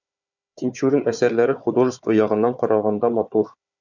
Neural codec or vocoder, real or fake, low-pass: codec, 16 kHz, 4 kbps, FunCodec, trained on Chinese and English, 50 frames a second; fake; 7.2 kHz